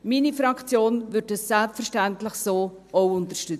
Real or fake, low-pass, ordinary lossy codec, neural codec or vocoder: real; 14.4 kHz; none; none